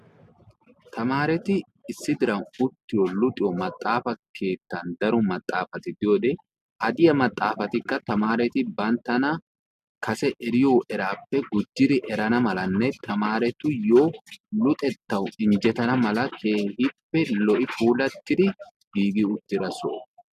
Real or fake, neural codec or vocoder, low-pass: real; none; 14.4 kHz